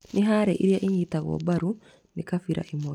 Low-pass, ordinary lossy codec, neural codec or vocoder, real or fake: 19.8 kHz; none; none; real